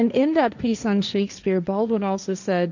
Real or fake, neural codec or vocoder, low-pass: fake; codec, 16 kHz, 1.1 kbps, Voila-Tokenizer; 7.2 kHz